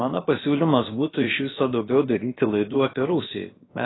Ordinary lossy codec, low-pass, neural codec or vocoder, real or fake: AAC, 16 kbps; 7.2 kHz; codec, 16 kHz, about 1 kbps, DyCAST, with the encoder's durations; fake